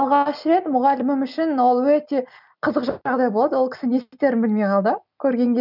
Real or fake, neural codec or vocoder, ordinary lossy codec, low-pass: real; none; none; 5.4 kHz